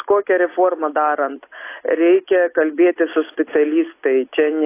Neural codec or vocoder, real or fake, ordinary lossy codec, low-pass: none; real; AAC, 24 kbps; 3.6 kHz